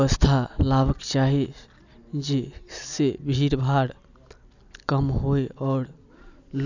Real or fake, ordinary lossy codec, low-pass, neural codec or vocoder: real; none; 7.2 kHz; none